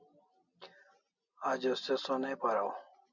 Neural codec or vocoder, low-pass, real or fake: none; 7.2 kHz; real